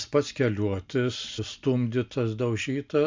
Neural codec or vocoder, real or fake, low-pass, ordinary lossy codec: none; real; 7.2 kHz; MP3, 64 kbps